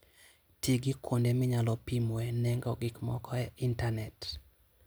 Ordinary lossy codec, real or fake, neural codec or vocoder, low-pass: none; real; none; none